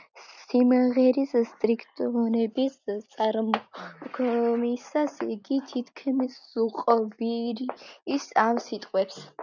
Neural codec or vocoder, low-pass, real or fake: none; 7.2 kHz; real